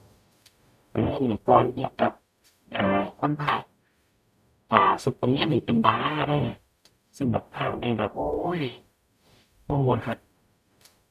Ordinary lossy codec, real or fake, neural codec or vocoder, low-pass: none; fake; codec, 44.1 kHz, 0.9 kbps, DAC; 14.4 kHz